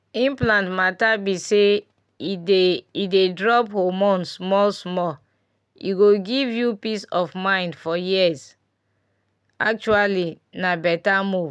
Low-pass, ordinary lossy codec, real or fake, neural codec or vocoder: none; none; real; none